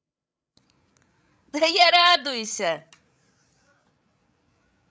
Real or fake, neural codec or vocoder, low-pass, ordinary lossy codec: fake; codec, 16 kHz, 16 kbps, FreqCodec, larger model; none; none